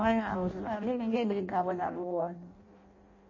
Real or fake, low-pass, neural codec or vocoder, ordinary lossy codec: fake; 7.2 kHz; codec, 16 kHz in and 24 kHz out, 0.6 kbps, FireRedTTS-2 codec; MP3, 32 kbps